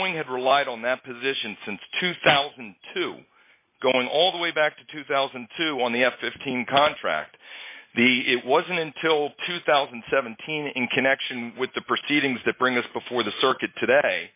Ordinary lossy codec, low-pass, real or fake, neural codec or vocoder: MP3, 24 kbps; 3.6 kHz; real; none